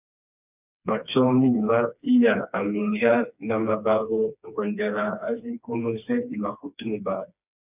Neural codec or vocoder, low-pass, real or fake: codec, 16 kHz, 2 kbps, FreqCodec, smaller model; 3.6 kHz; fake